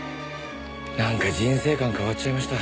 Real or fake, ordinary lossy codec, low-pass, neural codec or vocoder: real; none; none; none